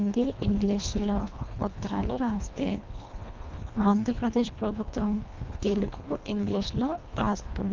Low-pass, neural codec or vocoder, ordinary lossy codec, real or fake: 7.2 kHz; codec, 24 kHz, 1.5 kbps, HILCodec; Opus, 24 kbps; fake